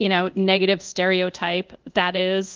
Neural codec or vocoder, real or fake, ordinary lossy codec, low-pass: codec, 24 kHz, 0.5 kbps, DualCodec; fake; Opus, 24 kbps; 7.2 kHz